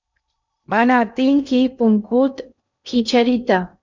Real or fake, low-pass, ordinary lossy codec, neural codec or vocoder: fake; 7.2 kHz; MP3, 64 kbps; codec, 16 kHz in and 24 kHz out, 0.6 kbps, FocalCodec, streaming, 2048 codes